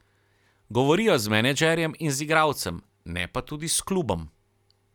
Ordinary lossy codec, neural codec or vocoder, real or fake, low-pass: none; none; real; 19.8 kHz